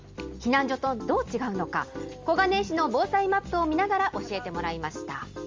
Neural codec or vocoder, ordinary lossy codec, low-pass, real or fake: none; Opus, 32 kbps; 7.2 kHz; real